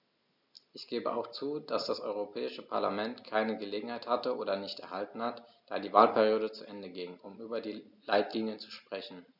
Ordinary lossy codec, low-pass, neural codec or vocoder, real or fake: none; 5.4 kHz; none; real